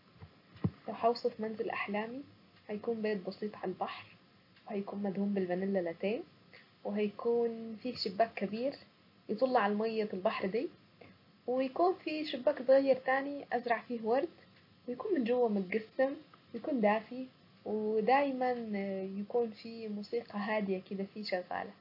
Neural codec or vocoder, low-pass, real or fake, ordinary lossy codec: none; 5.4 kHz; real; MP3, 32 kbps